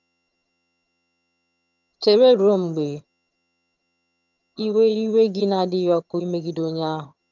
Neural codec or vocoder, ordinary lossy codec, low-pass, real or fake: vocoder, 22.05 kHz, 80 mel bands, HiFi-GAN; none; 7.2 kHz; fake